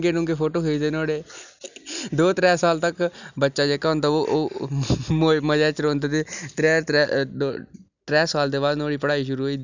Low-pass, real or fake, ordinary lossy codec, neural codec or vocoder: 7.2 kHz; real; none; none